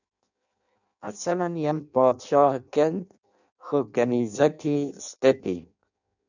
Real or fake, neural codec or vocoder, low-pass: fake; codec, 16 kHz in and 24 kHz out, 0.6 kbps, FireRedTTS-2 codec; 7.2 kHz